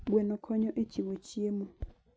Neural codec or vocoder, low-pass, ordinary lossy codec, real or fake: none; none; none; real